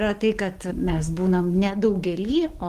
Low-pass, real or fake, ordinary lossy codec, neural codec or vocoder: 14.4 kHz; fake; Opus, 24 kbps; codec, 44.1 kHz, 7.8 kbps, DAC